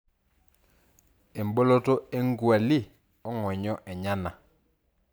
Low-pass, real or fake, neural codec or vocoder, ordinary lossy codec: none; real; none; none